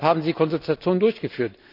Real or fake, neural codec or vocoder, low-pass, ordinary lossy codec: real; none; 5.4 kHz; none